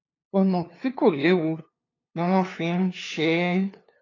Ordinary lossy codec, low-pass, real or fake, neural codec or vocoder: AAC, 32 kbps; 7.2 kHz; fake; codec, 16 kHz, 2 kbps, FunCodec, trained on LibriTTS, 25 frames a second